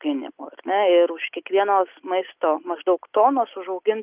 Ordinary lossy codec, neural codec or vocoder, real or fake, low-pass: Opus, 32 kbps; none; real; 3.6 kHz